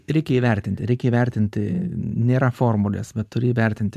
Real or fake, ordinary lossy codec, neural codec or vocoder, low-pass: fake; MP3, 96 kbps; vocoder, 44.1 kHz, 128 mel bands every 512 samples, BigVGAN v2; 14.4 kHz